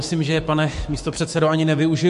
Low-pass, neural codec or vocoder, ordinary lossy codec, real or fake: 14.4 kHz; autoencoder, 48 kHz, 128 numbers a frame, DAC-VAE, trained on Japanese speech; MP3, 48 kbps; fake